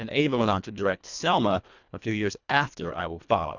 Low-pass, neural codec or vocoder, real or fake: 7.2 kHz; codec, 24 kHz, 1.5 kbps, HILCodec; fake